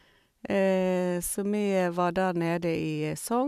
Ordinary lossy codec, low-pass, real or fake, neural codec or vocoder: none; 14.4 kHz; fake; vocoder, 44.1 kHz, 128 mel bands every 512 samples, BigVGAN v2